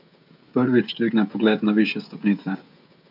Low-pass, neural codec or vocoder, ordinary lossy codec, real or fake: 5.4 kHz; codec, 16 kHz, 16 kbps, FreqCodec, smaller model; none; fake